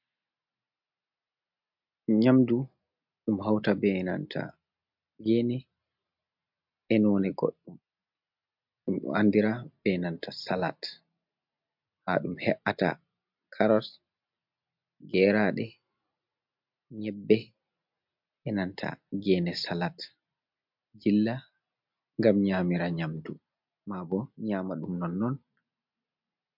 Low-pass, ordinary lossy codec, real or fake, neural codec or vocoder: 5.4 kHz; MP3, 48 kbps; real; none